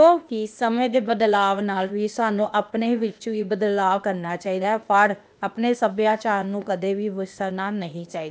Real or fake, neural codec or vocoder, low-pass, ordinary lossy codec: fake; codec, 16 kHz, 0.8 kbps, ZipCodec; none; none